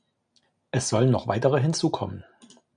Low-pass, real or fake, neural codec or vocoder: 9.9 kHz; real; none